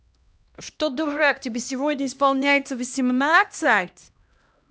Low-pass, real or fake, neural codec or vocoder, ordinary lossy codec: none; fake; codec, 16 kHz, 1 kbps, X-Codec, HuBERT features, trained on LibriSpeech; none